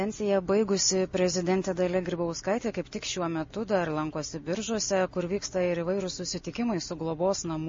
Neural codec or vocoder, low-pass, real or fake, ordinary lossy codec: none; 7.2 kHz; real; MP3, 32 kbps